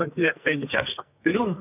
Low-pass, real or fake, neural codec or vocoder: 3.6 kHz; fake; codec, 44.1 kHz, 2.6 kbps, SNAC